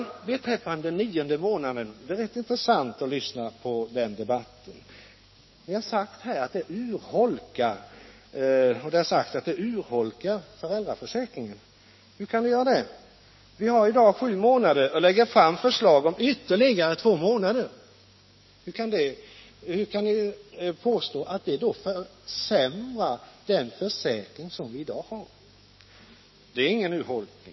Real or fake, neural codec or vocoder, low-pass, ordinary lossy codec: fake; codec, 16 kHz, 6 kbps, DAC; 7.2 kHz; MP3, 24 kbps